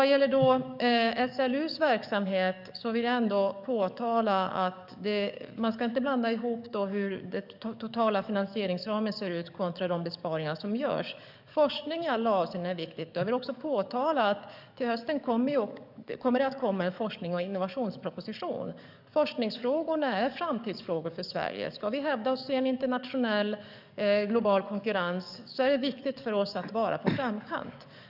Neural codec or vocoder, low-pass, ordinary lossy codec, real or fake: codec, 44.1 kHz, 7.8 kbps, DAC; 5.4 kHz; none; fake